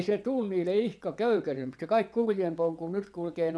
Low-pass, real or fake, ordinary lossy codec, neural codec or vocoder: none; fake; none; vocoder, 22.05 kHz, 80 mel bands, WaveNeXt